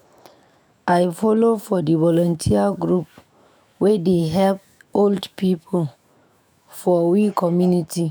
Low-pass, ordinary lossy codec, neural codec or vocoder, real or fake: none; none; autoencoder, 48 kHz, 128 numbers a frame, DAC-VAE, trained on Japanese speech; fake